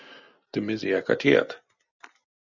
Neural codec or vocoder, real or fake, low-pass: none; real; 7.2 kHz